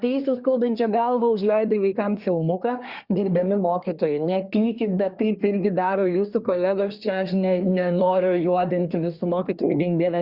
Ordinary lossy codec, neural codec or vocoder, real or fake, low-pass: Opus, 64 kbps; codec, 24 kHz, 1 kbps, SNAC; fake; 5.4 kHz